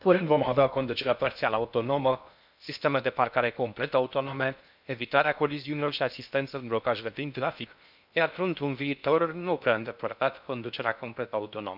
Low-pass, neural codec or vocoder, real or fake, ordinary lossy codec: 5.4 kHz; codec, 16 kHz in and 24 kHz out, 0.6 kbps, FocalCodec, streaming, 2048 codes; fake; none